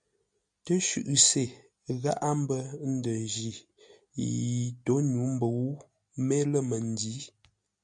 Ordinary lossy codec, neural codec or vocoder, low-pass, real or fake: MP3, 64 kbps; none; 9.9 kHz; real